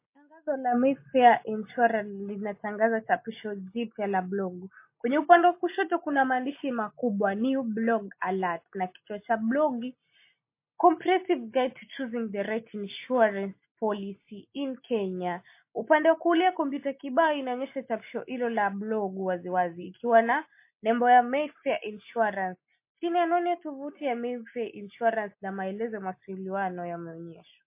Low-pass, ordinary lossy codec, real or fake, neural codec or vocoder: 3.6 kHz; MP3, 24 kbps; real; none